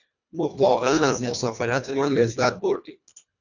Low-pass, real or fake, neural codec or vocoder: 7.2 kHz; fake; codec, 24 kHz, 1.5 kbps, HILCodec